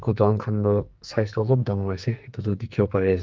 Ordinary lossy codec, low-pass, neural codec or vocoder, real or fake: Opus, 32 kbps; 7.2 kHz; codec, 44.1 kHz, 2.6 kbps, SNAC; fake